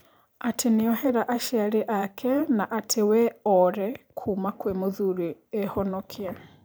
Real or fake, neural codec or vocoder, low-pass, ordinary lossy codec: fake; vocoder, 44.1 kHz, 128 mel bands every 512 samples, BigVGAN v2; none; none